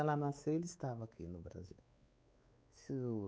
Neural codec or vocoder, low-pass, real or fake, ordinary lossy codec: codec, 16 kHz, 4 kbps, X-Codec, WavLM features, trained on Multilingual LibriSpeech; none; fake; none